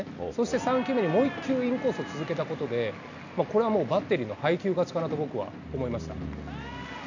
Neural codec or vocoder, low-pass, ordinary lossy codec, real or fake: none; 7.2 kHz; none; real